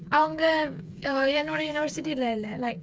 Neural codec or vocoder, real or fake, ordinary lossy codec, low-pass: codec, 16 kHz, 4 kbps, FreqCodec, smaller model; fake; none; none